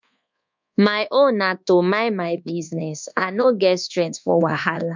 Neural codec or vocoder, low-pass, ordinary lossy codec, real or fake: codec, 24 kHz, 1.2 kbps, DualCodec; 7.2 kHz; MP3, 64 kbps; fake